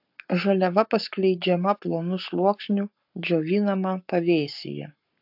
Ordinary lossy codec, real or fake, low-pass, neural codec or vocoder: AAC, 48 kbps; fake; 5.4 kHz; codec, 44.1 kHz, 7.8 kbps, Pupu-Codec